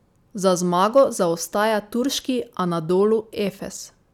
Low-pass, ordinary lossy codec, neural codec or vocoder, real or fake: 19.8 kHz; none; none; real